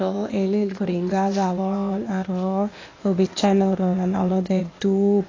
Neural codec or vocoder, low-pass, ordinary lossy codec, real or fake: codec, 16 kHz, 0.8 kbps, ZipCodec; 7.2 kHz; AAC, 32 kbps; fake